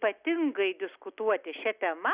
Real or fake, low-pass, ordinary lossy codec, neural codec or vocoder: real; 3.6 kHz; Opus, 64 kbps; none